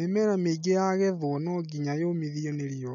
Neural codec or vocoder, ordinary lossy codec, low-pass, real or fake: none; none; 7.2 kHz; real